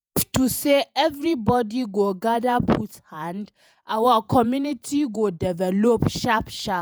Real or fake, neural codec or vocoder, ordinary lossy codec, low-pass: fake; vocoder, 48 kHz, 128 mel bands, Vocos; none; none